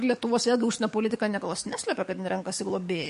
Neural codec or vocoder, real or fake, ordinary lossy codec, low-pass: codec, 44.1 kHz, 7.8 kbps, DAC; fake; MP3, 48 kbps; 14.4 kHz